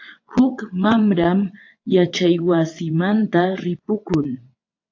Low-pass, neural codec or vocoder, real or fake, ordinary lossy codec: 7.2 kHz; vocoder, 22.05 kHz, 80 mel bands, WaveNeXt; fake; AAC, 48 kbps